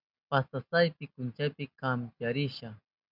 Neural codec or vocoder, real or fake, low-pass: none; real; 5.4 kHz